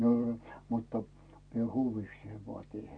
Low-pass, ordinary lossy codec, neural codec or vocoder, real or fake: 9.9 kHz; none; none; real